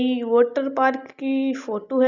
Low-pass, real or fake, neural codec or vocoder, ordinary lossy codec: 7.2 kHz; real; none; none